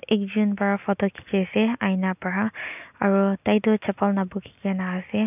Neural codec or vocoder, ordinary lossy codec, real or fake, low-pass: none; none; real; 3.6 kHz